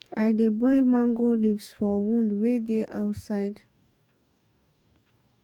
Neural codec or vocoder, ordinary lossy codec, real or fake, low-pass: codec, 44.1 kHz, 2.6 kbps, DAC; none; fake; 19.8 kHz